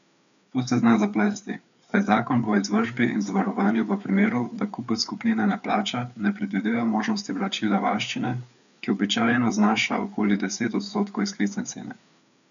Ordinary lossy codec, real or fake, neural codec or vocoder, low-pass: none; fake; codec, 16 kHz, 4 kbps, FreqCodec, larger model; 7.2 kHz